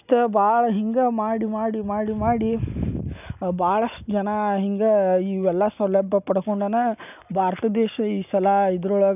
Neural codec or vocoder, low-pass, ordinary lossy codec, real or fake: none; 3.6 kHz; none; real